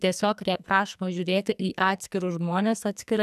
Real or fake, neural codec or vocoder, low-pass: fake; codec, 44.1 kHz, 2.6 kbps, SNAC; 14.4 kHz